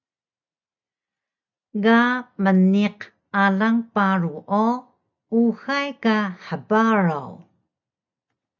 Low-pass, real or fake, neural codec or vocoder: 7.2 kHz; real; none